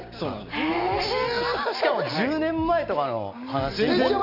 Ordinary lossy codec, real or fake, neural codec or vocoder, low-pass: none; real; none; 5.4 kHz